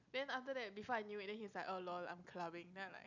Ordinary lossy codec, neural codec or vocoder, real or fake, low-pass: none; none; real; 7.2 kHz